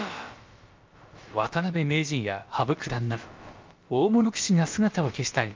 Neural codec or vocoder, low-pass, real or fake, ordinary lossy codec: codec, 16 kHz, about 1 kbps, DyCAST, with the encoder's durations; 7.2 kHz; fake; Opus, 24 kbps